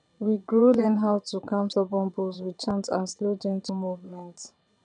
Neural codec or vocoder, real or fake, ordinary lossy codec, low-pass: vocoder, 22.05 kHz, 80 mel bands, WaveNeXt; fake; none; 9.9 kHz